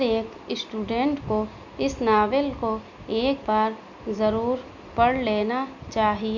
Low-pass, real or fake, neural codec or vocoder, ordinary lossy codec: 7.2 kHz; real; none; none